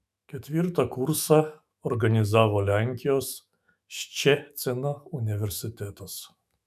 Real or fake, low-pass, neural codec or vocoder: fake; 14.4 kHz; autoencoder, 48 kHz, 128 numbers a frame, DAC-VAE, trained on Japanese speech